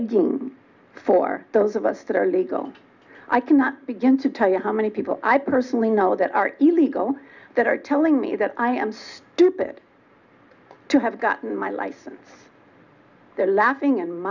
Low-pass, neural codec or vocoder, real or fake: 7.2 kHz; none; real